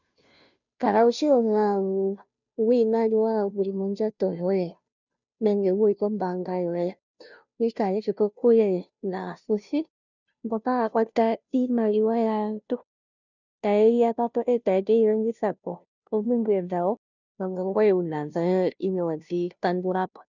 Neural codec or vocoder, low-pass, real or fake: codec, 16 kHz, 0.5 kbps, FunCodec, trained on Chinese and English, 25 frames a second; 7.2 kHz; fake